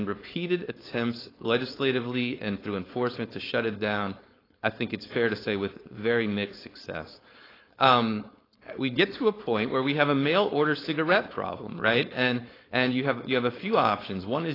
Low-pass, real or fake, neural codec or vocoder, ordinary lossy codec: 5.4 kHz; fake; codec, 16 kHz, 4.8 kbps, FACodec; AAC, 24 kbps